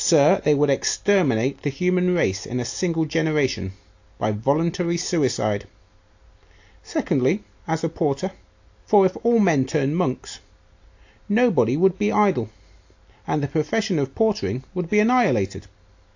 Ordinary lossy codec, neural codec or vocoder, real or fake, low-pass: AAC, 48 kbps; none; real; 7.2 kHz